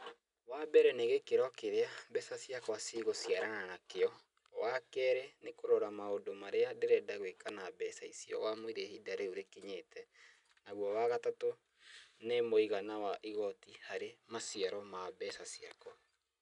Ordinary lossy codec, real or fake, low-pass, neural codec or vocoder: none; real; 10.8 kHz; none